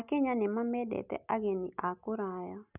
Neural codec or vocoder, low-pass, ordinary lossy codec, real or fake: none; 3.6 kHz; none; real